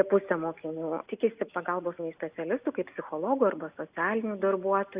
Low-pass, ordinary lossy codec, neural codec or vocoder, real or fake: 3.6 kHz; Opus, 64 kbps; none; real